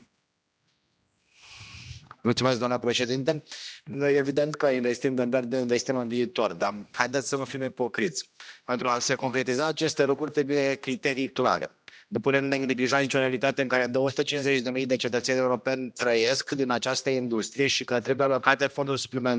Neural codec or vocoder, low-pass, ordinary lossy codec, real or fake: codec, 16 kHz, 1 kbps, X-Codec, HuBERT features, trained on general audio; none; none; fake